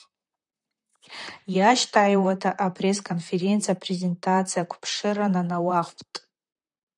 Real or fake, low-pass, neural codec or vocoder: fake; 10.8 kHz; vocoder, 44.1 kHz, 128 mel bands, Pupu-Vocoder